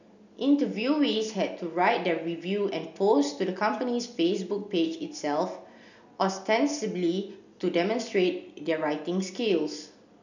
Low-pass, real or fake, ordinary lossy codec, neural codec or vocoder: 7.2 kHz; real; none; none